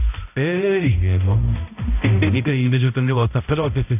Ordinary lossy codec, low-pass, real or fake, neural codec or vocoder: none; 3.6 kHz; fake; codec, 16 kHz, 0.5 kbps, X-Codec, HuBERT features, trained on balanced general audio